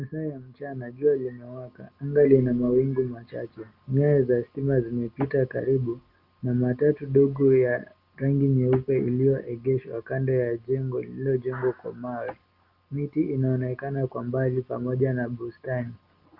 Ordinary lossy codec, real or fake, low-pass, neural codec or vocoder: Opus, 24 kbps; real; 5.4 kHz; none